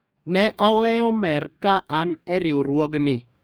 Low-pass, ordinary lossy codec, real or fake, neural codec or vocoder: none; none; fake; codec, 44.1 kHz, 2.6 kbps, DAC